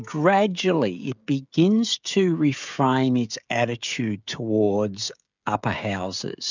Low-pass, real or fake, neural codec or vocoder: 7.2 kHz; fake; codec, 16 kHz, 16 kbps, FreqCodec, smaller model